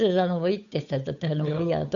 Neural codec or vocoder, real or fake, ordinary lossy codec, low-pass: codec, 16 kHz, 8 kbps, FunCodec, trained on Chinese and English, 25 frames a second; fake; none; 7.2 kHz